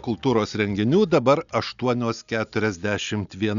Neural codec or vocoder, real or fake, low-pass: none; real; 7.2 kHz